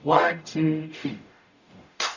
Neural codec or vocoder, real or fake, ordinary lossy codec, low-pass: codec, 44.1 kHz, 0.9 kbps, DAC; fake; none; 7.2 kHz